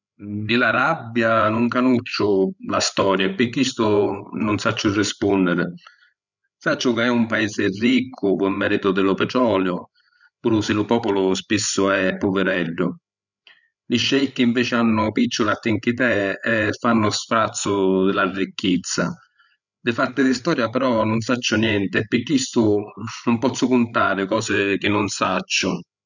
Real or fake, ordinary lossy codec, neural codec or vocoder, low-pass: fake; none; codec, 16 kHz, 8 kbps, FreqCodec, larger model; 7.2 kHz